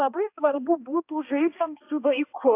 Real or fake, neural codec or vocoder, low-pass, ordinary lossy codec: fake; codec, 16 kHz, 1 kbps, X-Codec, HuBERT features, trained on balanced general audio; 3.6 kHz; AAC, 24 kbps